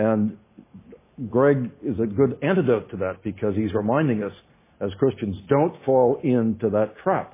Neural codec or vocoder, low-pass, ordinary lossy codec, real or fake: none; 3.6 kHz; MP3, 16 kbps; real